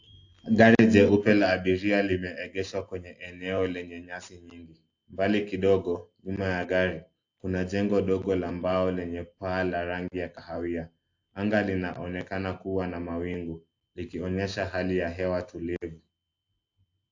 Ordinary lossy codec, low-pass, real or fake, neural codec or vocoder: AAC, 48 kbps; 7.2 kHz; real; none